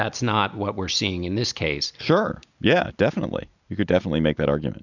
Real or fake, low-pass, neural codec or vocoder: real; 7.2 kHz; none